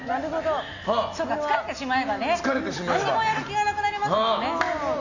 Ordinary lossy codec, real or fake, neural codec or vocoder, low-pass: none; real; none; 7.2 kHz